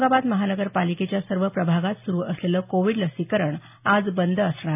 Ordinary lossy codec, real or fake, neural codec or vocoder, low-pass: AAC, 32 kbps; real; none; 3.6 kHz